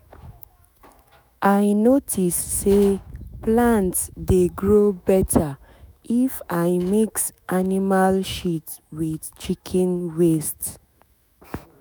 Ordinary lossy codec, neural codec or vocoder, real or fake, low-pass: none; autoencoder, 48 kHz, 128 numbers a frame, DAC-VAE, trained on Japanese speech; fake; none